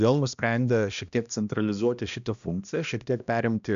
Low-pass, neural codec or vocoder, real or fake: 7.2 kHz; codec, 16 kHz, 1 kbps, X-Codec, HuBERT features, trained on balanced general audio; fake